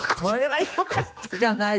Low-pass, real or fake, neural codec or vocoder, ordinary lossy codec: none; fake; codec, 16 kHz, 2 kbps, X-Codec, HuBERT features, trained on general audio; none